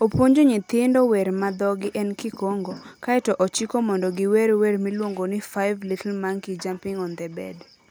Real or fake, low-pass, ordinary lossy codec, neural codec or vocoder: real; none; none; none